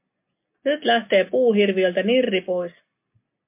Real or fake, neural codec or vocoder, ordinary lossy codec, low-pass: real; none; MP3, 24 kbps; 3.6 kHz